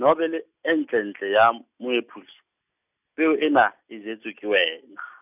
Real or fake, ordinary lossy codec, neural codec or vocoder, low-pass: real; none; none; 3.6 kHz